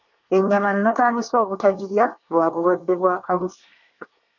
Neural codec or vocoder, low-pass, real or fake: codec, 24 kHz, 1 kbps, SNAC; 7.2 kHz; fake